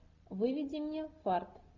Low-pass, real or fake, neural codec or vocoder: 7.2 kHz; real; none